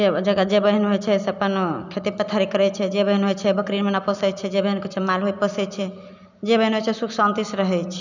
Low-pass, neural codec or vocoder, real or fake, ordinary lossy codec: 7.2 kHz; none; real; none